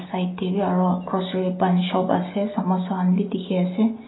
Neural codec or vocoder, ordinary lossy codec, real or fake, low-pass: none; AAC, 16 kbps; real; 7.2 kHz